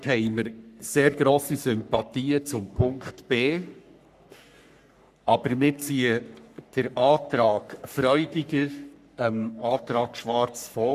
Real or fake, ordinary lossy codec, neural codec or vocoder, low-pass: fake; none; codec, 44.1 kHz, 3.4 kbps, Pupu-Codec; 14.4 kHz